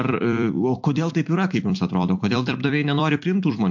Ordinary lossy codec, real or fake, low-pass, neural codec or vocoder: MP3, 48 kbps; fake; 7.2 kHz; vocoder, 44.1 kHz, 128 mel bands every 256 samples, BigVGAN v2